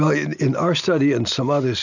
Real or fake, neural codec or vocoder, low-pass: real; none; 7.2 kHz